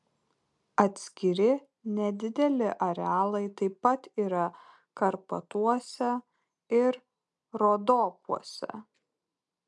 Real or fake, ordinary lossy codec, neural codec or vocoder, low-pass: real; MP3, 96 kbps; none; 10.8 kHz